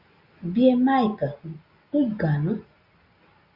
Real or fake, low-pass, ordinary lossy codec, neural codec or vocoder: real; 5.4 kHz; Opus, 64 kbps; none